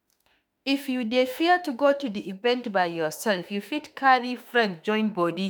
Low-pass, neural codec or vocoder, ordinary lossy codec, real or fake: none; autoencoder, 48 kHz, 32 numbers a frame, DAC-VAE, trained on Japanese speech; none; fake